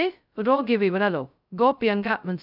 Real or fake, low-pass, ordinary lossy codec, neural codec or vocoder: fake; 5.4 kHz; none; codec, 16 kHz, 0.2 kbps, FocalCodec